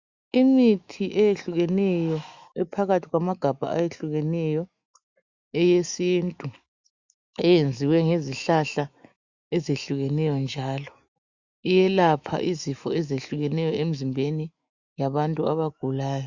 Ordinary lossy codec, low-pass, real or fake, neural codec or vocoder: Opus, 64 kbps; 7.2 kHz; fake; autoencoder, 48 kHz, 128 numbers a frame, DAC-VAE, trained on Japanese speech